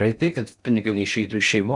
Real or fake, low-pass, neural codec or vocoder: fake; 10.8 kHz; codec, 16 kHz in and 24 kHz out, 0.6 kbps, FocalCodec, streaming, 4096 codes